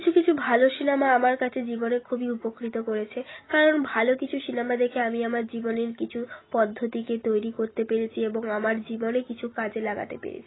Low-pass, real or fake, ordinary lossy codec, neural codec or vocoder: 7.2 kHz; real; AAC, 16 kbps; none